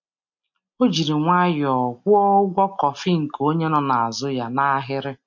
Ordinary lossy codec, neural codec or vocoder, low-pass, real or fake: MP3, 48 kbps; none; 7.2 kHz; real